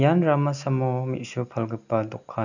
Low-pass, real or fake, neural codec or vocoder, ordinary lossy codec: 7.2 kHz; real; none; none